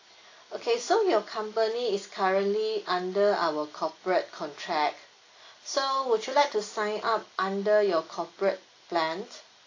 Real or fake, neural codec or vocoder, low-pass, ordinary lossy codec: real; none; 7.2 kHz; AAC, 32 kbps